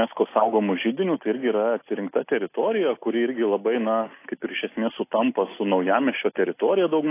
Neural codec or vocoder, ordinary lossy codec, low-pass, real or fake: none; AAC, 24 kbps; 3.6 kHz; real